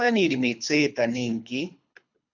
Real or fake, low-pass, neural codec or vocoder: fake; 7.2 kHz; codec, 24 kHz, 3 kbps, HILCodec